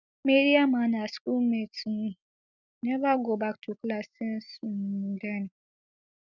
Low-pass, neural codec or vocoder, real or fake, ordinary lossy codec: 7.2 kHz; none; real; none